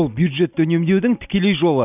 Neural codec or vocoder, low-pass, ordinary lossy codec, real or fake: none; 3.6 kHz; none; real